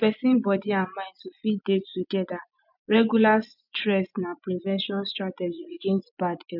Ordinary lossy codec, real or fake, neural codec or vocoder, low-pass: none; fake; vocoder, 24 kHz, 100 mel bands, Vocos; 5.4 kHz